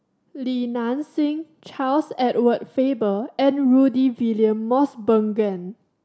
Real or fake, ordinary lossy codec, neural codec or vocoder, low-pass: real; none; none; none